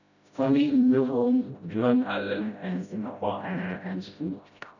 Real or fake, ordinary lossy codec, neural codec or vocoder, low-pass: fake; none; codec, 16 kHz, 0.5 kbps, FreqCodec, smaller model; 7.2 kHz